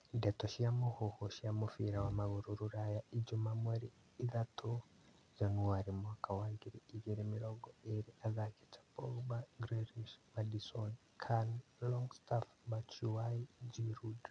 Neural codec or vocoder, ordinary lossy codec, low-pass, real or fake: none; none; 9.9 kHz; real